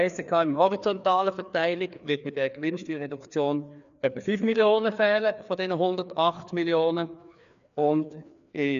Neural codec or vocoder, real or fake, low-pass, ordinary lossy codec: codec, 16 kHz, 2 kbps, FreqCodec, larger model; fake; 7.2 kHz; none